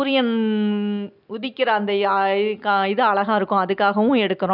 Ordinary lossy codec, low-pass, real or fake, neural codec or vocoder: none; 5.4 kHz; real; none